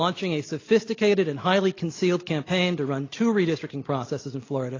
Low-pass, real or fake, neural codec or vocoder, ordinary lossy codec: 7.2 kHz; real; none; AAC, 32 kbps